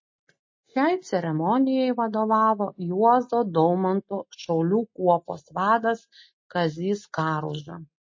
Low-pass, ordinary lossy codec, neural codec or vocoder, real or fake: 7.2 kHz; MP3, 32 kbps; none; real